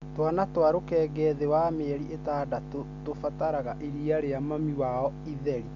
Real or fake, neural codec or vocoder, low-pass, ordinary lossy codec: real; none; 7.2 kHz; MP3, 64 kbps